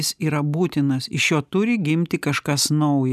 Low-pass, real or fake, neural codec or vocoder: 14.4 kHz; real; none